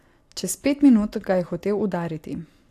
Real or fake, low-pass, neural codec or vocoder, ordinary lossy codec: real; 14.4 kHz; none; AAC, 64 kbps